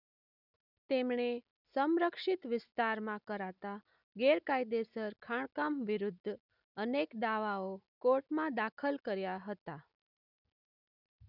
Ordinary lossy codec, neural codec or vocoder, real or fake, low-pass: none; none; real; 5.4 kHz